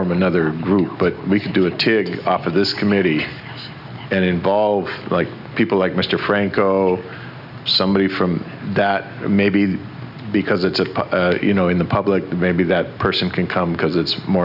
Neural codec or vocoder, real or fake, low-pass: none; real; 5.4 kHz